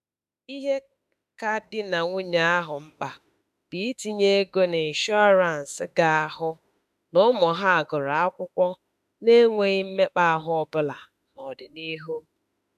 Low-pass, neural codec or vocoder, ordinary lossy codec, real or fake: 14.4 kHz; autoencoder, 48 kHz, 32 numbers a frame, DAC-VAE, trained on Japanese speech; none; fake